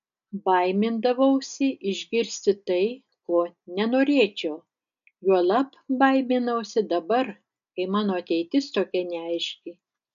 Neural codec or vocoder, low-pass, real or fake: none; 7.2 kHz; real